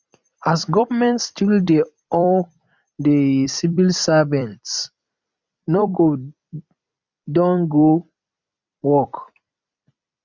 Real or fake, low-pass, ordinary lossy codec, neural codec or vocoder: fake; 7.2 kHz; none; vocoder, 44.1 kHz, 128 mel bands every 256 samples, BigVGAN v2